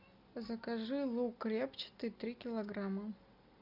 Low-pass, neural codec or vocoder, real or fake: 5.4 kHz; none; real